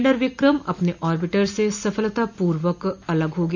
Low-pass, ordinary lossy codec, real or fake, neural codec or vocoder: 7.2 kHz; none; real; none